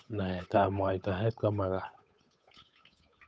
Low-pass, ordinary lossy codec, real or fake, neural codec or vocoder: none; none; fake; codec, 16 kHz, 8 kbps, FunCodec, trained on Chinese and English, 25 frames a second